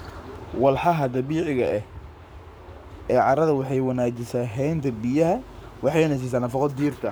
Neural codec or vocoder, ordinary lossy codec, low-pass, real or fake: codec, 44.1 kHz, 7.8 kbps, Pupu-Codec; none; none; fake